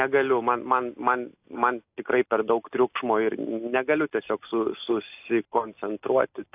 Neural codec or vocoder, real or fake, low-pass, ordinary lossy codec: none; real; 3.6 kHz; AAC, 32 kbps